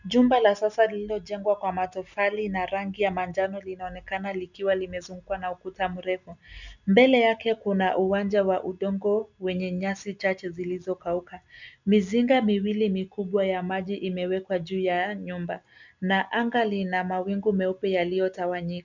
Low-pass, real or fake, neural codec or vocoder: 7.2 kHz; real; none